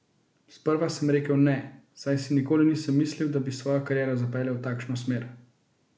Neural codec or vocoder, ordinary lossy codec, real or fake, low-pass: none; none; real; none